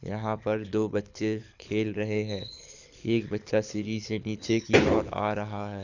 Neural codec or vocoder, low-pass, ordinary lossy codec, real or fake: codec, 24 kHz, 6 kbps, HILCodec; 7.2 kHz; none; fake